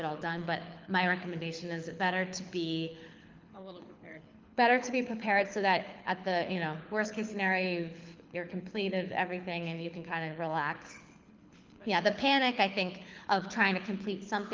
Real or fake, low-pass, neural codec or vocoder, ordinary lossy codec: fake; 7.2 kHz; codec, 24 kHz, 6 kbps, HILCodec; Opus, 24 kbps